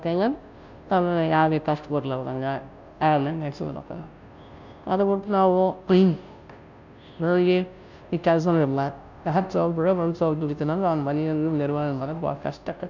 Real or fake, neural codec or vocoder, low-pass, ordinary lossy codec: fake; codec, 16 kHz, 0.5 kbps, FunCodec, trained on Chinese and English, 25 frames a second; 7.2 kHz; none